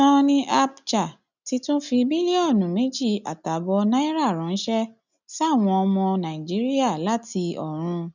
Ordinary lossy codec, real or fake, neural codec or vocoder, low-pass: none; real; none; 7.2 kHz